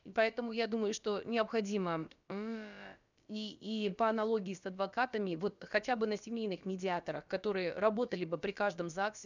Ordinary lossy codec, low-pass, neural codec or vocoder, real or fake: none; 7.2 kHz; codec, 16 kHz, about 1 kbps, DyCAST, with the encoder's durations; fake